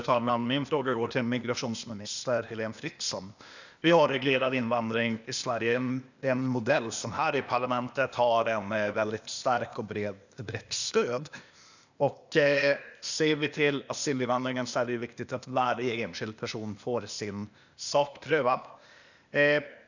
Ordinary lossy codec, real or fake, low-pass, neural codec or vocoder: none; fake; 7.2 kHz; codec, 16 kHz, 0.8 kbps, ZipCodec